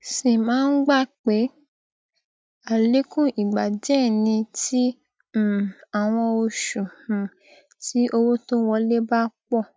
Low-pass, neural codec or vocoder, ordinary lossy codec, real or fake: none; none; none; real